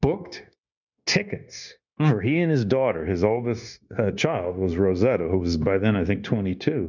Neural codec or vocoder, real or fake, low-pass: codec, 16 kHz, 0.9 kbps, LongCat-Audio-Codec; fake; 7.2 kHz